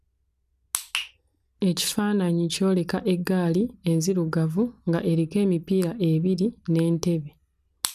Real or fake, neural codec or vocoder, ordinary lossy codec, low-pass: real; none; none; 14.4 kHz